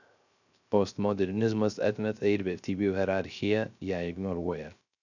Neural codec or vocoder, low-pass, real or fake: codec, 16 kHz, 0.3 kbps, FocalCodec; 7.2 kHz; fake